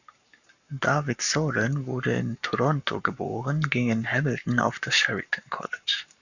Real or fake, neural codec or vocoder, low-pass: fake; codec, 44.1 kHz, 7.8 kbps, Pupu-Codec; 7.2 kHz